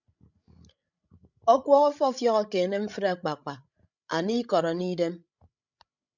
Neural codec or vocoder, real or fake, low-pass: codec, 16 kHz, 16 kbps, FreqCodec, larger model; fake; 7.2 kHz